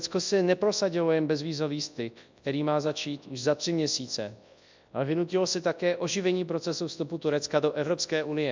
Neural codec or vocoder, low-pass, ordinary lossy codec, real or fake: codec, 24 kHz, 0.9 kbps, WavTokenizer, large speech release; 7.2 kHz; MP3, 64 kbps; fake